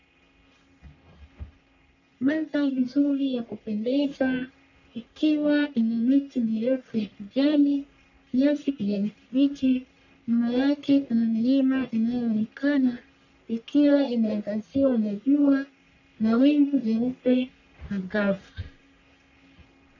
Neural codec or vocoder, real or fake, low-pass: codec, 44.1 kHz, 1.7 kbps, Pupu-Codec; fake; 7.2 kHz